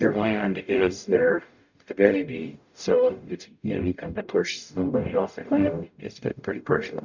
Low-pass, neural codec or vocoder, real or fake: 7.2 kHz; codec, 44.1 kHz, 0.9 kbps, DAC; fake